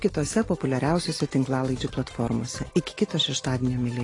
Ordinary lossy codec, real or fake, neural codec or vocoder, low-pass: AAC, 32 kbps; real; none; 10.8 kHz